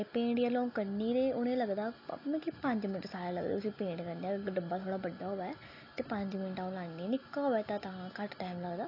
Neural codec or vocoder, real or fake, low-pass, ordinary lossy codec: none; real; 5.4 kHz; AAC, 32 kbps